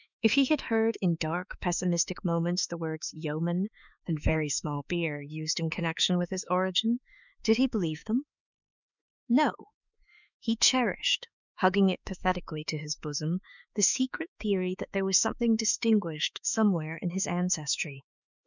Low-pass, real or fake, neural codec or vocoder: 7.2 kHz; fake; autoencoder, 48 kHz, 32 numbers a frame, DAC-VAE, trained on Japanese speech